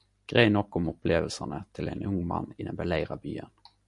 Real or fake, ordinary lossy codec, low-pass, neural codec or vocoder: real; MP3, 64 kbps; 10.8 kHz; none